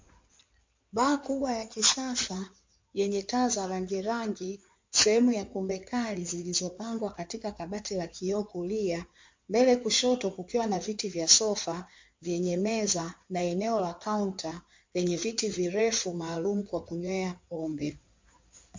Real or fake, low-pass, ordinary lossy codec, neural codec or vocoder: fake; 7.2 kHz; MP3, 48 kbps; codec, 16 kHz in and 24 kHz out, 2.2 kbps, FireRedTTS-2 codec